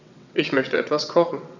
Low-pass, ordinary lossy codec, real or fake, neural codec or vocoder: 7.2 kHz; none; fake; vocoder, 22.05 kHz, 80 mel bands, WaveNeXt